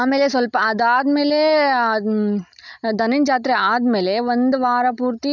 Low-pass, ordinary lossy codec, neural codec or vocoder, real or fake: 7.2 kHz; none; none; real